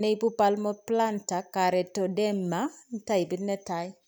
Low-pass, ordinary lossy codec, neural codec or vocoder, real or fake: none; none; none; real